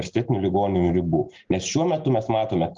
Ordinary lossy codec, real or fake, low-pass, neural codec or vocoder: Opus, 32 kbps; real; 7.2 kHz; none